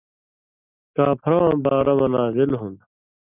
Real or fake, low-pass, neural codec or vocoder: real; 3.6 kHz; none